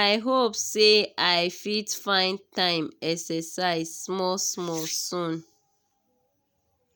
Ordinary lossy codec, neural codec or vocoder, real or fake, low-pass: none; none; real; none